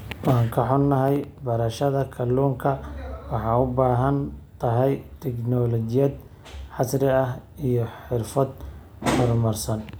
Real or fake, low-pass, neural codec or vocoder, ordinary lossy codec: real; none; none; none